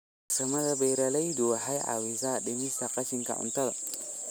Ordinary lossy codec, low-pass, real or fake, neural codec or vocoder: none; none; real; none